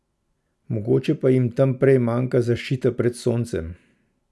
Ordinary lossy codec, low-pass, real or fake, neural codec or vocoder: none; none; real; none